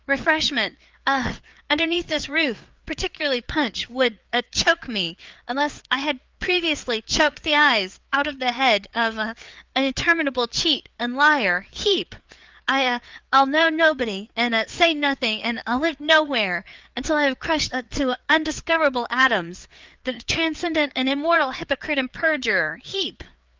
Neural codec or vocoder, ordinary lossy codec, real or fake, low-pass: codec, 16 kHz, 4 kbps, FreqCodec, larger model; Opus, 24 kbps; fake; 7.2 kHz